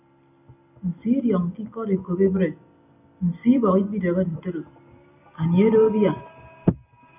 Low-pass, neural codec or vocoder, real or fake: 3.6 kHz; none; real